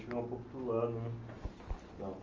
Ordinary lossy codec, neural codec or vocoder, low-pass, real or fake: Opus, 32 kbps; none; 7.2 kHz; real